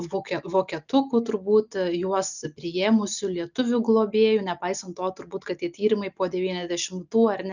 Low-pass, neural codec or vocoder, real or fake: 7.2 kHz; none; real